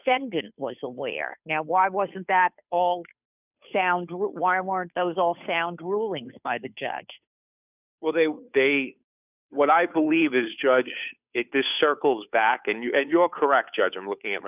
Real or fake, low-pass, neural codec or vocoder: fake; 3.6 kHz; codec, 16 kHz, 2 kbps, FunCodec, trained on Chinese and English, 25 frames a second